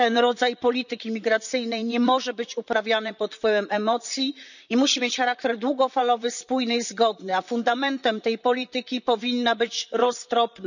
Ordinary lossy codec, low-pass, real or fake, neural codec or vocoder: none; 7.2 kHz; fake; vocoder, 44.1 kHz, 128 mel bands, Pupu-Vocoder